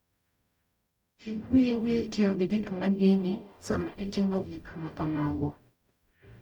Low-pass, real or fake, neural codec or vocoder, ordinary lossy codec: 19.8 kHz; fake; codec, 44.1 kHz, 0.9 kbps, DAC; none